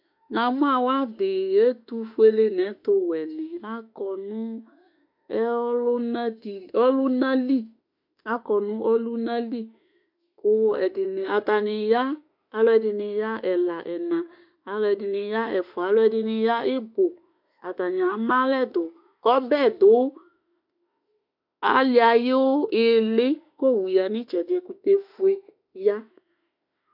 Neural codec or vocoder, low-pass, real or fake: autoencoder, 48 kHz, 32 numbers a frame, DAC-VAE, trained on Japanese speech; 5.4 kHz; fake